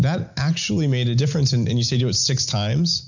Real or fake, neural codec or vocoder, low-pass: real; none; 7.2 kHz